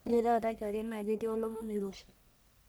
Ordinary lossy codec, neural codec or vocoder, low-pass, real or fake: none; codec, 44.1 kHz, 1.7 kbps, Pupu-Codec; none; fake